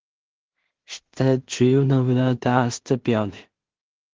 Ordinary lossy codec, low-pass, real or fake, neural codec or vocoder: Opus, 16 kbps; 7.2 kHz; fake; codec, 16 kHz in and 24 kHz out, 0.4 kbps, LongCat-Audio-Codec, two codebook decoder